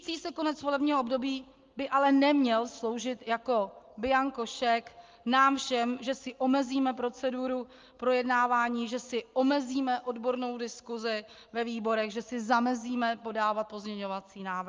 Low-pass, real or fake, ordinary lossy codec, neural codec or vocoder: 7.2 kHz; real; Opus, 24 kbps; none